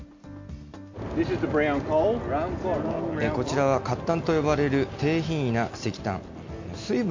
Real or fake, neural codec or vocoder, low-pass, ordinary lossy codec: real; none; 7.2 kHz; MP3, 48 kbps